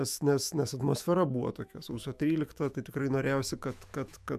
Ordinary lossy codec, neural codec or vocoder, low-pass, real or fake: AAC, 96 kbps; autoencoder, 48 kHz, 128 numbers a frame, DAC-VAE, trained on Japanese speech; 14.4 kHz; fake